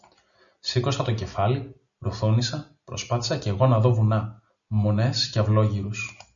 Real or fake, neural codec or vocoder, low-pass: real; none; 7.2 kHz